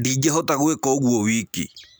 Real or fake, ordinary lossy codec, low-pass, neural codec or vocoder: real; none; none; none